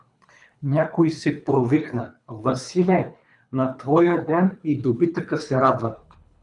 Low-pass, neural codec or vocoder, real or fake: 10.8 kHz; codec, 24 kHz, 3 kbps, HILCodec; fake